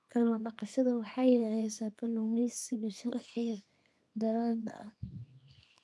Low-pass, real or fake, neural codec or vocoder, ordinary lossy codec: none; fake; codec, 24 kHz, 0.9 kbps, WavTokenizer, small release; none